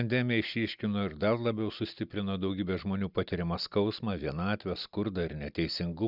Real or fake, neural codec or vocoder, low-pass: real; none; 5.4 kHz